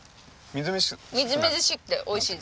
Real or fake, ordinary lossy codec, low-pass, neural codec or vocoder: real; none; none; none